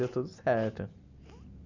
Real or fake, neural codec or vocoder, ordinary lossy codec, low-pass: real; none; none; 7.2 kHz